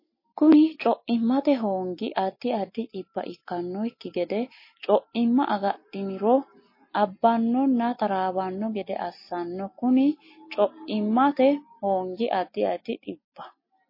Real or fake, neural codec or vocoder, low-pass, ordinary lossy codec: real; none; 5.4 kHz; MP3, 24 kbps